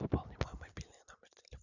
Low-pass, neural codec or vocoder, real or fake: 7.2 kHz; vocoder, 44.1 kHz, 128 mel bands every 256 samples, BigVGAN v2; fake